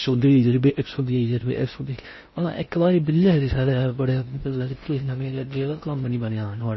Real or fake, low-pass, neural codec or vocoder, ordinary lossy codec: fake; 7.2 kHz; codec, 16 kHz in and 24 kHz out, 0.6 kbps, FocalCodec, streaming, 4096 codes; MP3, 24 kbps